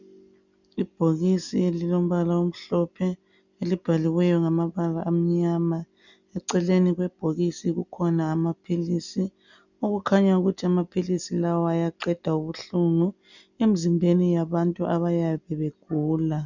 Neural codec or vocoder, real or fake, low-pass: none; real; 7.2 kHz